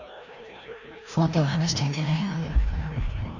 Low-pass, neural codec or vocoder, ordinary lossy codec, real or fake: 7.2 kHz; codec, 16 kHz, 1 kbps, FreqCodec, larger model; none; fake